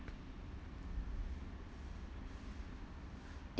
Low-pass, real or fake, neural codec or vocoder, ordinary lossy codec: none; real; none; none